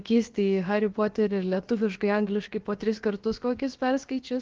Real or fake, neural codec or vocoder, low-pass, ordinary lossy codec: fake; codec, 16 kHz, about 1 kbps, DyCAST, with the encoder's durations; 7.2 kHz; Opus, 16 kbps